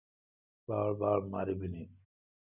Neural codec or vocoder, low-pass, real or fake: none; 3.6 kHz; real